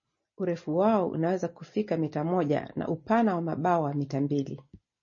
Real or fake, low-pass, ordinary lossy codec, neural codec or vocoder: real; 7.2 kHz; MP3, 32 kbps; none